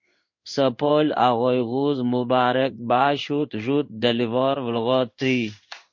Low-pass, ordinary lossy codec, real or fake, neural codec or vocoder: 7.2 kHz; MP3, 48 kbps; fake; codec, 16 kHz in and 24 kHz out, 1 kbps, XY-Tokenizer